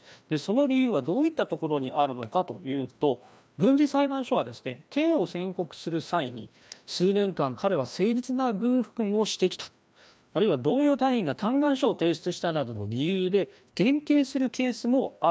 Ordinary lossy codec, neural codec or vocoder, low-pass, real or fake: none; codec, 16 kHz, 1 kbps, FreqCodec, larger model; none; fake